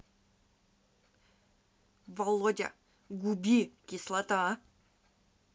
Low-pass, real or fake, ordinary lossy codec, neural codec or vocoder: none; real; none; none